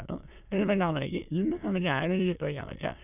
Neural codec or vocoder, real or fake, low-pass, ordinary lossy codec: autoencoder, 22.05 kHz, a latent of 192 numbers a frame, VITS, trained on many speakers; fake; 3.6 kHz; none